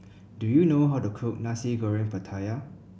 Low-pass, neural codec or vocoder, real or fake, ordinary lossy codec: none; none; real; none